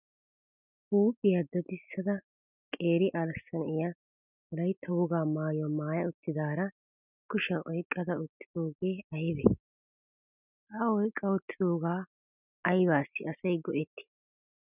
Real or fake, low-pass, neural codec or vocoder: real; 3.6 kHz; none